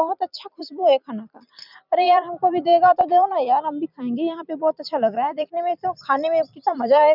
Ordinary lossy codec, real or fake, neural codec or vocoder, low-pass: none; fake; vocoder, 44.1 kHz, 128 mel bands every 256 samples, BigVGAN v2; 5.4 kHz